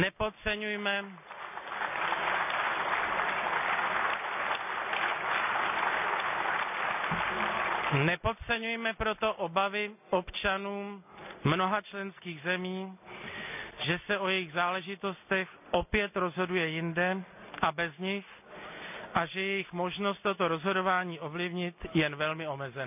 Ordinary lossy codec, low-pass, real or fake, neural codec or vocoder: none; 3.6 kHz; real; none